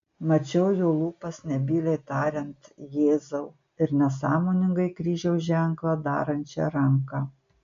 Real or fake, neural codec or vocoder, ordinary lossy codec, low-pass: real; none; MP3, 64 kbps; 7.2 kHz